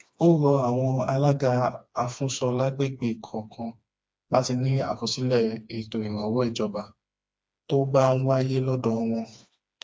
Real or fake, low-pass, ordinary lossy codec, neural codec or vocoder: fake; none; none; codec, 16 kHz, 2 kbps, FreqCodec, smaller model